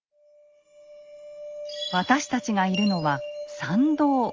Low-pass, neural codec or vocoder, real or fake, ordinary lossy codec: 7.2 kHz; none; real; Opus, 32 kbps